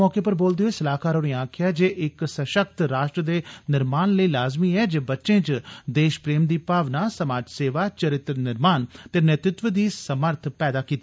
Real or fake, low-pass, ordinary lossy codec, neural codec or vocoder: real; none; none; none